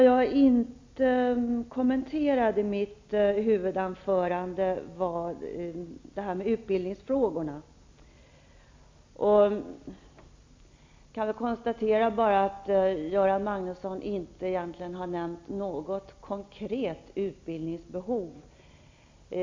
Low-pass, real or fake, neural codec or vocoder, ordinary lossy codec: 7.2 kHz; real; none; MP3, 48 kbps